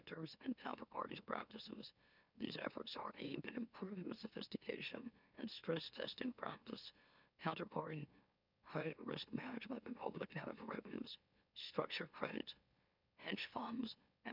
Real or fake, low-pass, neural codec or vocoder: fake; 5.4 kHz; autoencoder, 44.1 kHz, a latent of 192 numbers a frame, MeloTTS